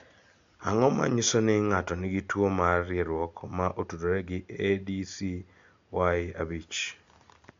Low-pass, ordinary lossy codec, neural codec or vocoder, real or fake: 7.2 kHz; MP3, 64 kbps; none; real